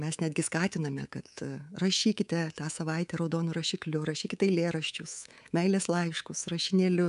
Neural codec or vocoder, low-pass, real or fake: codec, 24 kHz, 3.1 kbps, DualCodec; 10.8 kHz; fake